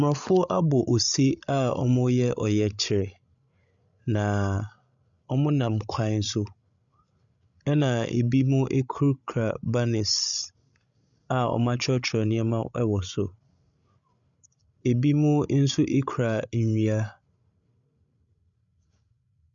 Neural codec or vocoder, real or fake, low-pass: codec, 16 kHz, 16 kbps, FreqCodec, larger model; fake; 7.2 kHz